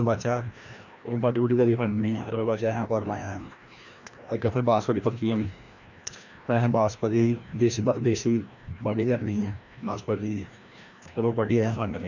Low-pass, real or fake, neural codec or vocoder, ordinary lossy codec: 7.2 kHz; fake; codec, 16 kHz, 1 kbps, FreqCodec, larger model; none